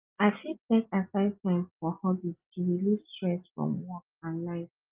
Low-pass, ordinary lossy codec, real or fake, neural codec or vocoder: 3.6 kHz; Opus, 32 kbps; real; none